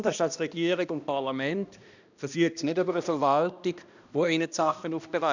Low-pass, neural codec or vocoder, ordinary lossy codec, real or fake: 7.2 kHz; codec, 16 kHz, 1 kbps, X-Codec, HuBERT features, trained on balanced general audio; none; fake